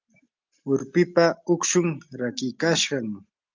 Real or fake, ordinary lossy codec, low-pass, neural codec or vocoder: real; Opus, 32 kbps; 7.2 kHz; none